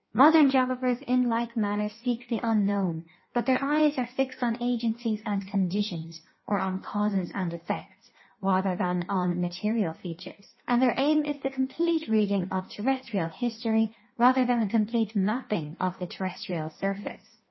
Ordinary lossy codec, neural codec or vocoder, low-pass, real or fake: MP3, 24 kbps; codec, 16 kHz in and 24 kHz out, 1.1 kbps, FireRedTTS-2 codec; 7.2 kHz; fake